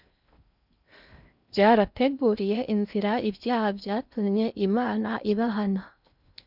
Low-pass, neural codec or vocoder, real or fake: 5.4 kHz; codec, 16 kHz in and 24 kHz out, 0.6 kbps, FocalCodec, streaming, 2048 codes; fake